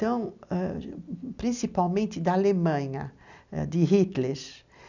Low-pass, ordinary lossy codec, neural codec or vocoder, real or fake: 7.2 kHz; none; none; real